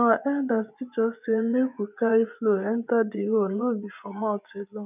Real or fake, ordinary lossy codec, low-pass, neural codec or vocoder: fake; none; 3.6 kHz; vocoder, 24 kHz, 100 mel bands, Vocos